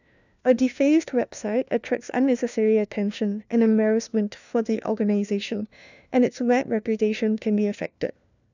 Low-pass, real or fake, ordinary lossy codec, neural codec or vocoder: 7.2 kHz; fake; none; codec, 16 kHz, 1 kbps, FunCodec, trained on LibriTTS, 50 frames a second